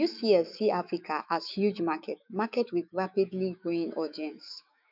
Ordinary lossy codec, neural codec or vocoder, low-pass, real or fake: none; autoencoder, 48 kHz, 128 numbers a frame, DAC-VAE, trained on Japanese speech; 5.4 kHz; fake